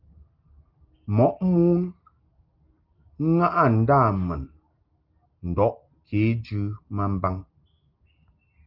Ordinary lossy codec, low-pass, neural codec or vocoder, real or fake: Opus, 24 kbps; 5.4 kHz; none; real